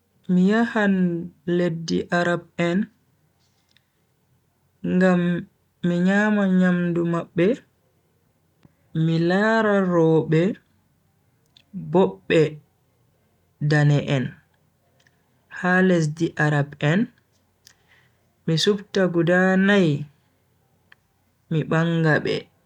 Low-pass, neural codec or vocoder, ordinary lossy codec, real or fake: 19.8 kHz; none; none; real